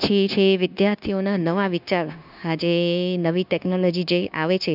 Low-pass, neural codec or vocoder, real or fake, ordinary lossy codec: 5.4 kHz; codec, 16 kHz, 0.9 kbps, LongCat-Audio-Codec; fake; none